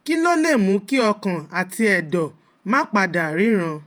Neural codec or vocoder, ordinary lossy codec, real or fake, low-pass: vocoder, 48 kHz, 128 mel bands, Vocos; none; fake; none